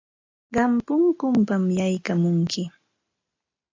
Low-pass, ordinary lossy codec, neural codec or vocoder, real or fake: 7.2 kHz; AAC, 48 kbps; none; real